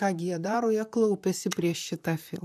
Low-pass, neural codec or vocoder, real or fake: 14.4 kHz; vocoder, 44.1 kHz, 128 mel bands, Pupu-Vocoder; fake